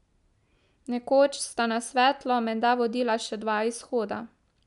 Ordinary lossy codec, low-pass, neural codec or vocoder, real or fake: none; 10.8 kHz; none; real